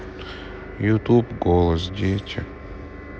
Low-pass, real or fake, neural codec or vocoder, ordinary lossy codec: none; real; none; none